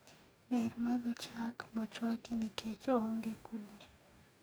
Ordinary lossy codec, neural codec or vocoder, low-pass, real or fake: none; codec, 44.1 kHz, 2.6 kbps, DAC; none; fake